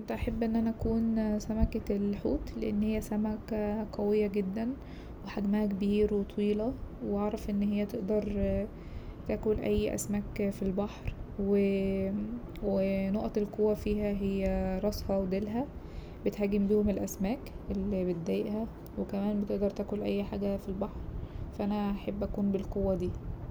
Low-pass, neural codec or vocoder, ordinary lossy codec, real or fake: none; none; none; real